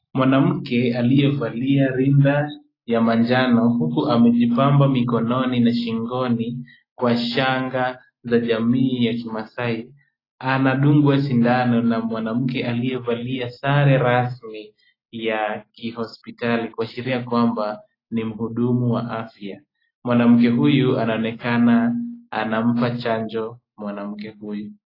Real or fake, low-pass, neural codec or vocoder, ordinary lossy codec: real; 5.4 kHz; none; AAC, 24 kbps